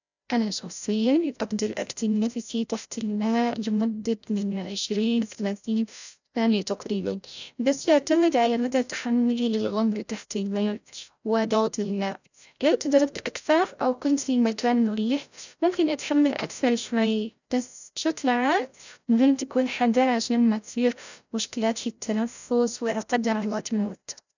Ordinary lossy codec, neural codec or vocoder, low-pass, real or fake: none; codec, 16 kHz, 0.5 kbps, FreqCodec, larger model; 7.2 kHz; fake